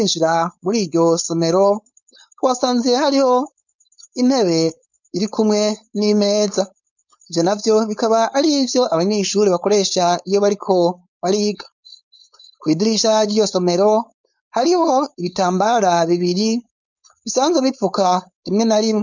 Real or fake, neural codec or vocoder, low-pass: fake; codec, 16 kHz, 4.8 kbps, FACodec; 7.2 kHz